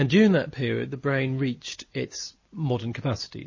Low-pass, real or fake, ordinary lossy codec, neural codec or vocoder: 7.2 kHz; real; MP3, 32 kbps; none